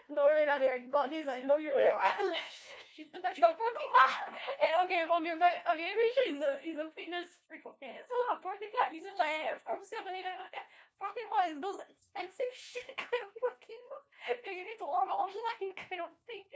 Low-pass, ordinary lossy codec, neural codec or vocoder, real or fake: none; none; codec, 16 kHz, 1 kbps, FunCodec, trained on LibriTTS, 50 frames a second; fake